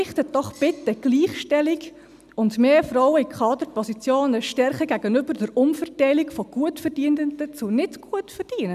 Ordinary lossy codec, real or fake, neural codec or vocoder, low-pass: none; real; none; 14.4 kHz